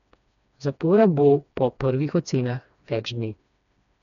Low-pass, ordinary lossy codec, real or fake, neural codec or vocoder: 7.2 kHz; none; fake; codec, 16 kHz, 2 kbps, FreqCodec, smaller model